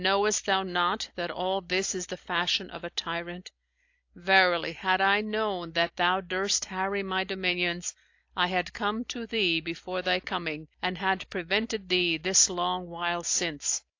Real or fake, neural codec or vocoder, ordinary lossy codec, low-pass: real; none; AAC, 48 kbps; 7.2 kHz